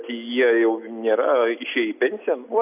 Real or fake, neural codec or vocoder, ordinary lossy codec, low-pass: real; none; AAC, 32 kbps; 3.6 kHz